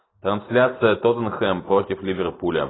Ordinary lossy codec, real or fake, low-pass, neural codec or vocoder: AAC, 16 kbps; real; 7.2 kHz; none